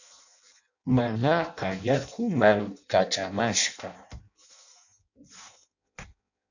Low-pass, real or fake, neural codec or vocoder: 7.2 kHz; fake; codec, 16 kHz in and 24 kHz out, 0.6 kbps, FireRedTTS-2 codec